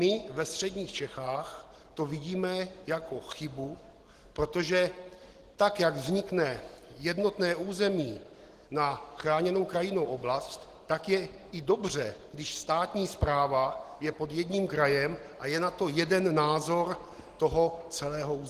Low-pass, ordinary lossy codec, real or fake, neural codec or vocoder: 14.4 kHz; Opus, 16 kbps; real; none